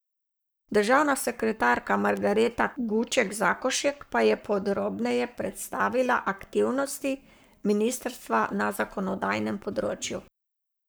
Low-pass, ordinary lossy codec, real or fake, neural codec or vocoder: none; none; fake; codec, 44.1 kHz, 7.8 kbps, Pupu-Codec